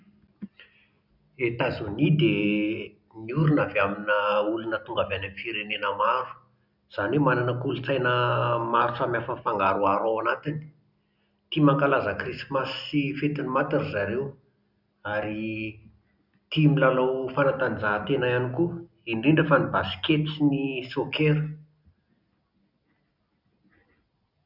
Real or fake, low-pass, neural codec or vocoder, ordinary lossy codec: real; 5.4 kHz; none; none